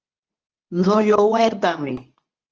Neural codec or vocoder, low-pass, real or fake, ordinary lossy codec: codec, 24 kHz, 0.9 kbps, WavTokenizer, medium speech release version 2; 7.2 kHz; fake; Opus, 24 kbps